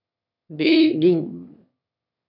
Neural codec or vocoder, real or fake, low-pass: autoencoder, 22.05 kHz, a latent of 192 numbers a frame, VITS, trained on one speaker; fake; 5.4 kHz